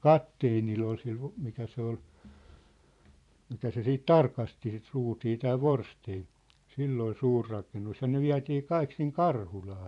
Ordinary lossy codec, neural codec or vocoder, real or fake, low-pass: none; vocoder, 44.1 kHz, 128 mel bands every 512 samples, BigVGAN v2; fake; 10.8 kHz